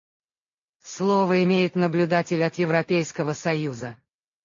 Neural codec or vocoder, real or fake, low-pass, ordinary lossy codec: none; real; 7.2 kHz; AAC, 64 kbps